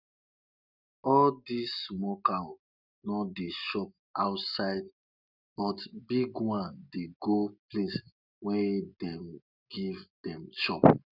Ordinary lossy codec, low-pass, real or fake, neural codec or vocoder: none; 5.4 kHz; real; none